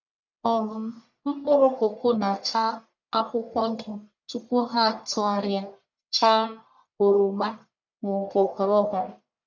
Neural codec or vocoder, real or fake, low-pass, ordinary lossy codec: codec, 44.1 kHz, 1.7 kbps, Pupu-Codec; fake; 7.2 kHz; none